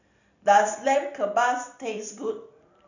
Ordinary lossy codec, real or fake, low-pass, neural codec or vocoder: none; fake; 7.2 kHz; vocoder, 44.1 kHz, 128 mel bands every 256 samples, BigVGAN v2